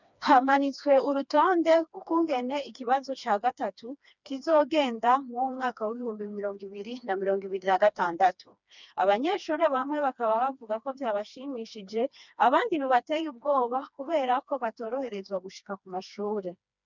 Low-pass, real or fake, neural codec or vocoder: 7.2 kHz; fake; codec, 16 kHz, 2 kbps, FreqCodec, smaller model